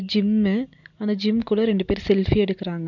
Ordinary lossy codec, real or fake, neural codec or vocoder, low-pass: none; real; none; 7.2 kHz